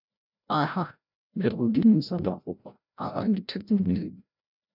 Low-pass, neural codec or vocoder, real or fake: 5.4 kHz; codec, 16 kHz, 0.5 kbps, FreqCodec, larger model; fake